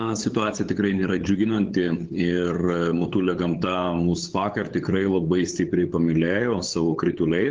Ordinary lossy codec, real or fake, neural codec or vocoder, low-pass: Opus, 16 kbps; fake; codec, 16 kHz, 8 kbps, FunCodec, trained on LibriTTS, 25 frames a second; 7.2 kHz